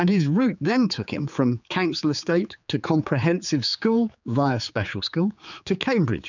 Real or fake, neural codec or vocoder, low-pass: fake; codec, 16 kHz, 4 kbps, X-Codec, HuBERT features, trained on general audio; 7.2 kHz